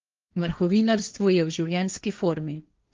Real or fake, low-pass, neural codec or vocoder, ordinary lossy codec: fake; 7.2 kHz; codec, 16 kHz, 1.1 kbps, Voila-Tokenizer; Opus, 32 kbps